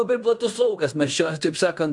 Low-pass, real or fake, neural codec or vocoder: 10.8 kHz; fake; codec, 16 kHz in and 24 kHz out, 0.9 kbps, LongCat-Audio-Codec, fine tuned four codebook decoder